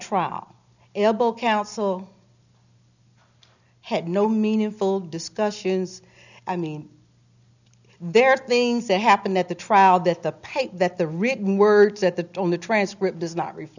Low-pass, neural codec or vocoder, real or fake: 7.2 kHz; none; real